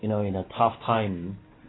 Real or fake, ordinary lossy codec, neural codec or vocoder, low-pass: real; AAC, 16 kbps; none; 7.2 kHz